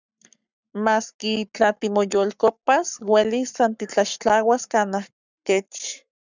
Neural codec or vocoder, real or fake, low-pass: codec, 44.1 kHz, 7.8 kbps, Pupu-Codec; fake; 7.2 kHz